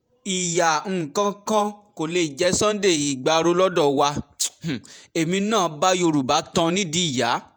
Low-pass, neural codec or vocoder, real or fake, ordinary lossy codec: none; vocoder, 48 kHz, 128 mel bands, Vocos; fake; none